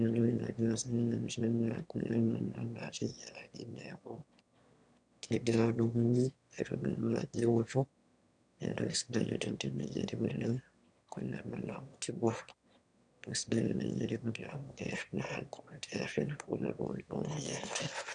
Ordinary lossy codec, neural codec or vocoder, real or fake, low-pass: none; autoencoder, 22.05 kHz, a latent of 192 numbers a frame, VITS, trained on one speaker; fake; 9.9 kHz